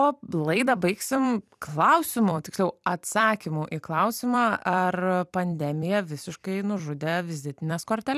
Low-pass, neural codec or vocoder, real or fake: 14.4 kHz; vocoder, 44.1 kHz, 128 mel bands every 256 samples, BigVGAN v2; fake